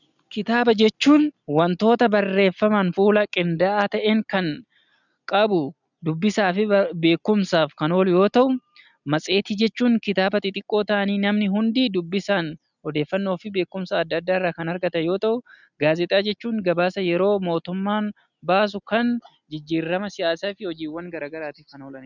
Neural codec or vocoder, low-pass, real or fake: none; 7.2 kHz; real